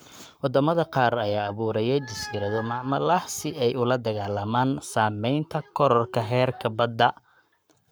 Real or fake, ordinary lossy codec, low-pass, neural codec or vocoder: fake; none; none; codec, 44.1 kHz, 7.8 kbps, Pupu-Codec